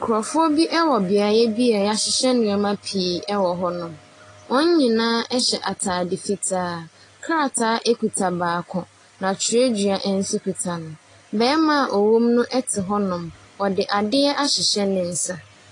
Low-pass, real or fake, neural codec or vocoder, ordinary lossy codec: 10.8 kHz; real; none; AAC, 32 kbps